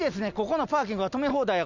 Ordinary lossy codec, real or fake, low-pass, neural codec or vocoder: none; real; 7.2 kHz; none